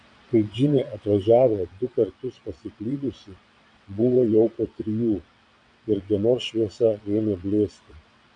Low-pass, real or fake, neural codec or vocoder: 9.9 kHz; fake; vocoder, 22.05 kHz, 80 mel bands, WaveNeXt